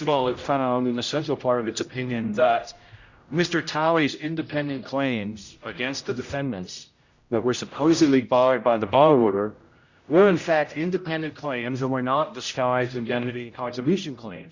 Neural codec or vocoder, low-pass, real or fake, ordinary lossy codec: codec, 16 kHz, 0.5 kbps, X-Codec, HuBERT features, trained on general audio; 7.2 kHz; fake; Opus, 64 kbps